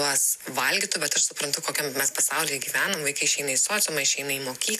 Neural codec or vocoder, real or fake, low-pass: none; real; 14.4 kHz